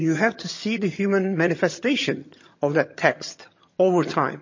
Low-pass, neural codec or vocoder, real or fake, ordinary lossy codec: 7.2 kHz; vocoder, 22.05 kHz, 80 mel bands, HiFi-GAN; fake; MP3, 32 kbps